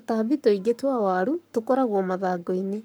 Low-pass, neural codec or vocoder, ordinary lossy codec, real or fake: none; codec, 44.1 kHz, 7.8 kbps, Pupu-Codec; none; fake